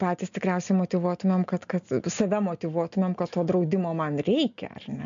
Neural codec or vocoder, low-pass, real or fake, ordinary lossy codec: none; 7.2 kHz; real; MP3, 64 kbps